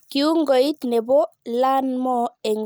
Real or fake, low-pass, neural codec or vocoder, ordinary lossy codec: real; none; none; none